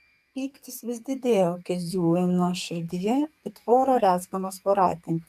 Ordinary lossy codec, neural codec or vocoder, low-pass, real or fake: MP3, 96 kbps; codec, 44.1 kHz, 2.6 kbps, SNAC; 14.4 kHz; fake